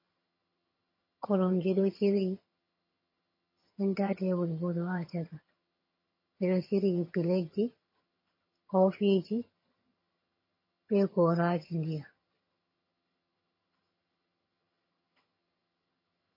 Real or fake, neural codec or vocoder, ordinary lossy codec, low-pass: fake; vocoder, 22.05 kHz, 80 mel bands, HiFi-GAN; MP3, 24 kbps; 5.4 kHz